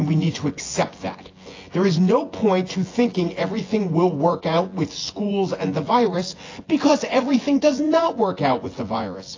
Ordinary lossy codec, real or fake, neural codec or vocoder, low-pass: AAC, 32 kbps; fake; vocoder, 24 kHz, 100 mel bands, Vocos; 7.2 kHz